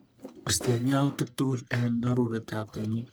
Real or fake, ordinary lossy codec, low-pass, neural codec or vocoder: fake; none; none; codec, 44.1 kHz, 1.7 kbps, Pupu-Codec